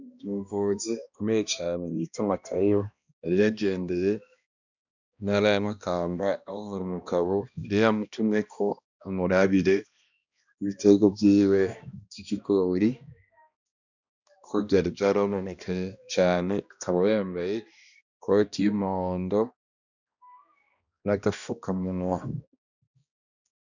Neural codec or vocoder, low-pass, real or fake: codec, 16 kHz, 1 kbps, X-Codec, HuBERT features, trained on balanced general audio; 7.2 kHz; fake